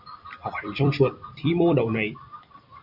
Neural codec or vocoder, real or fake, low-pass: vocoder, 44.1 kHz, 128 mel bands every 256 samples, BigVGAN v2; fake; 5.4 kHz